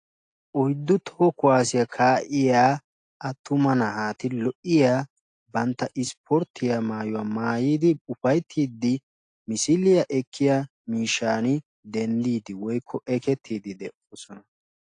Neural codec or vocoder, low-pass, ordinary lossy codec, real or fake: none; 9.9 kHz; AAC, 48 kbps; real